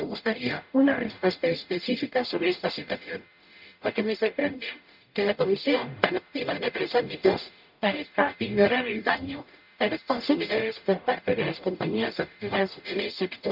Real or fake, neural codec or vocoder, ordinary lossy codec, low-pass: fake; codec, 44.1 kHz, 0.9 kbps, DAC; none; 5.4 kHz